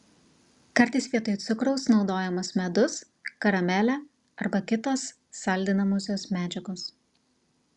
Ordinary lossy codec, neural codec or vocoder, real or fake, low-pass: Opus, 64 kbps; none; real; 10.8 kHz